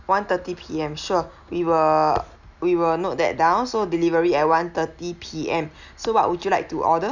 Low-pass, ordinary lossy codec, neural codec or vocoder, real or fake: 7.2 kHz; none; none; real